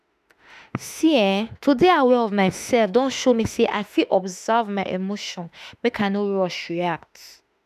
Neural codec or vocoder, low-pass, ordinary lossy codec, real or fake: autoencoder, 48 kHz, 32 numbers a frame, DAC-VAE, trained on Japanese speech; 14.4 kHz; none; fake